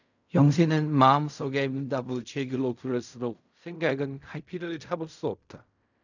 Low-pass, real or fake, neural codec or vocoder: 7.2 kHz; fake; codec, 16 kHz in and 24 kHz out, 0.4 kbps, LongCat-Audio-Codec, fine tuned four codebook decoder